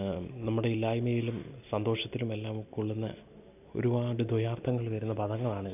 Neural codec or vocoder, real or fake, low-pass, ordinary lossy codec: none; real; 3.6 kHz; none